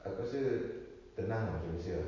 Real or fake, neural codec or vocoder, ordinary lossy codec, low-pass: real; none; MP3, 32 kbps; 7.2 kHz